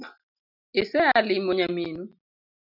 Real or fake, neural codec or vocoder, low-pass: real; none; 5.4 kHz